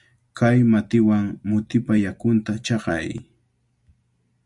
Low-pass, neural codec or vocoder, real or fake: 10.8 kHz; none; real